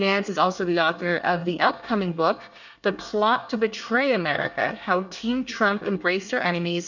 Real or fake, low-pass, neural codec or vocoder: fake; 7.2 kHz; codec, 24 kHz, 1 kbps, SNAC